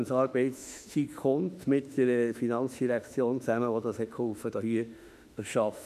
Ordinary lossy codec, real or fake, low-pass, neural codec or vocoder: none; fake; 14.4 kHz; autoencoder, 48 kHz, 32 numbers a frame, DAC-VAE, trained on Japanese speech